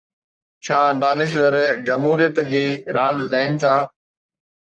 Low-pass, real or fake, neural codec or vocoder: 9.9 kHz; fake; codec, 44.1 kHz, 1.7 kbps, Pupu-Codec